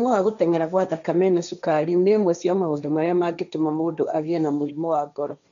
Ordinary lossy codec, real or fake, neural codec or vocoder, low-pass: none; fake; codec, 16 kHz, 1.1 kbps, Voila-Tokenizer; 7.2 kHz